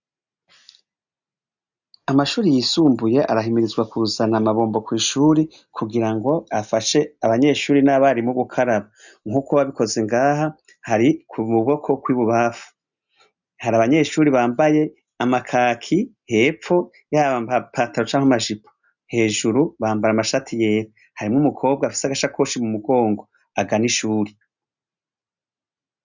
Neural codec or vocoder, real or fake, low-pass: none; real; 7.2 kHz